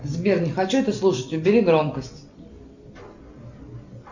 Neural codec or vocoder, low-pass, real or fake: none; 7.2 kHz; real